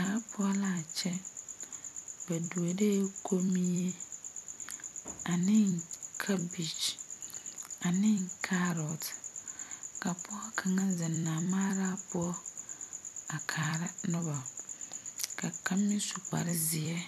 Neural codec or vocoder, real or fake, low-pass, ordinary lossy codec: vocoder, 44.1 kHz, 128 mel bands every 256 samples, BigVGAN v2; fake; 14.4 kHz; MP3, 96 kbps